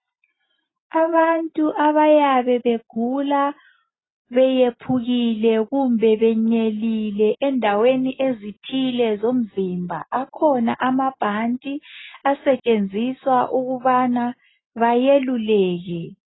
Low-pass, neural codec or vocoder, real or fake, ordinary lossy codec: 7.2 kHz; none; real; AAC, 16 kbps